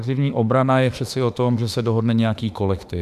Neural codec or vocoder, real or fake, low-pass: autoencoder, 48 kHz, 32 numbers a frame, DAC-VAE, trained on Japanese speech; fake; 14.4 kHz